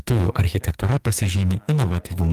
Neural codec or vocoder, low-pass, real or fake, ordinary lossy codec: codec, 44.1 kHz, 2.6 kbps, SNAC; 14.4 kHz; fake; Opus, 32 kbps